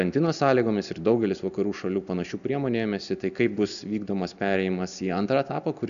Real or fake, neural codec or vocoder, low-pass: real; none; 7.2 kHz